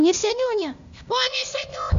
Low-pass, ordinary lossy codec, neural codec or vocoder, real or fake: 7.2 kHz; MP3, 64 kbps; codec, 16 kHz, 0.8 kbps, ZipCodec; fake